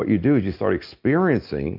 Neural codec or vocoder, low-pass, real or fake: none; 5.4 kHz; real